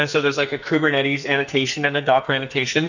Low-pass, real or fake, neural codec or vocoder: 7.2 kHz; fake; codec, 44.1 kHz, 2.6 kbps, SNAC